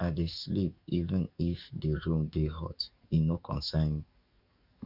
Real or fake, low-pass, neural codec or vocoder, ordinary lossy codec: fake; 5.4 kHz; codec, 44.1 kHz, 7.8 kbps, DAC; none